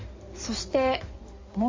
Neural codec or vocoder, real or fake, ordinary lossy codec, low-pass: codec, 16 kHz in and 24 kHz out, 2.2 kbps, FireRedTTS-2 codec; fake; MP3, 32 kbps; 7.2 kHz